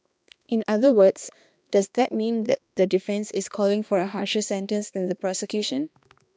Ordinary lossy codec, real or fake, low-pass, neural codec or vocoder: none; fake; none; codec, 16 kHz, 2 kbps, X-Codec, HuBERT features, trained on balanced general audio